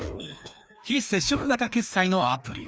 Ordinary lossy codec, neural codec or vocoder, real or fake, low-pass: none; codec, 16 kHz, 2 kbps, FreqCodec, larger model; fake; none